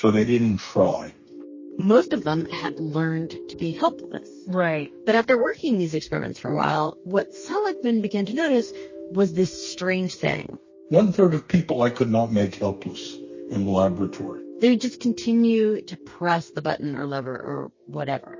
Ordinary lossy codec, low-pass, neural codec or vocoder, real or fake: MP3, 32 kbps; 7.2 kHz; codec, 32 kHz, 1.9 kbps, SNAC; fake